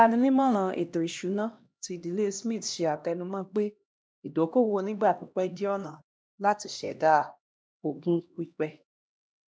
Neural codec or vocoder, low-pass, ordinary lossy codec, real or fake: codec, 16 kHz, 1 kbps, X-Codec, HuBERT features, trained on LibriSpeech; none; none; fake